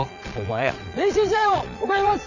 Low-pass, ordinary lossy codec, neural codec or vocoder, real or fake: 7.2 kHz; none; vocoder, 22.05 kHz, 80 mel bands, Vocos; fake